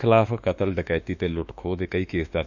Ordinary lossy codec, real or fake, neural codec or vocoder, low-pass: none; fake; autoencoder, 48 kHz, 32 numbers a frame, DAC-VAE, trained on Japanese speech; 7.2 kHz